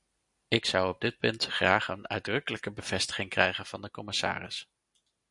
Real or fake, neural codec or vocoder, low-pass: real; none; 10.8 kHz